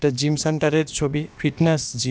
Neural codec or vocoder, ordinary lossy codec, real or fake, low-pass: codec, 16 kHz, about 1 kbps, DyCAST, with the encoder's durations; none; fake; none